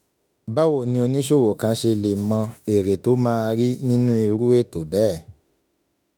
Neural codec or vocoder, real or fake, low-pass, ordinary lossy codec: autoencoder, 48 kHz, 32 numbers a frame, DAC-VAE, trained on Japanese speech; fake; none; none